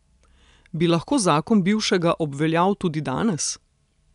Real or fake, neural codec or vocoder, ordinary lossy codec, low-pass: real; none; none; 10.8 kHz